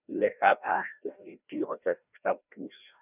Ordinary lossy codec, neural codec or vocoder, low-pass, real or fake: none; codec, 16 kHz, 1 kbps, FreqCodec, larger model; 3.6 kHz; fake